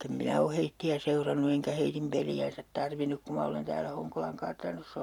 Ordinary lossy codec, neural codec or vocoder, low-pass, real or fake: none; vocoder, 44.1 kHz, 128 mel bands every 512 samples, BigVGAN v2; 19.8 kHz; fake